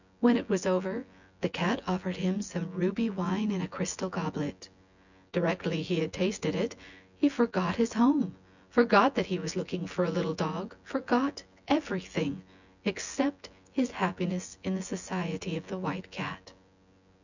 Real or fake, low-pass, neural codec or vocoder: fake; 7.2 kHz; vocoder, 24 kHz, 100 mel bands, Vocos